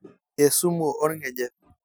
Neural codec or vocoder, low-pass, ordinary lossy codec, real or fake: none; none; none; real